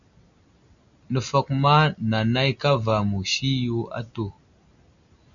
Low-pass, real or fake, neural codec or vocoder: 7.2 kHz; real; none